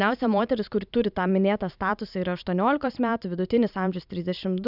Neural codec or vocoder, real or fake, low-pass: vocoder, 44.1 kHz, 128 mel bands every 512 samples, BigVGAN v2; fake; 5.4 kHz